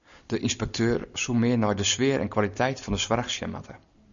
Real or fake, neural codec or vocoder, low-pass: real; none; 7.2 kHz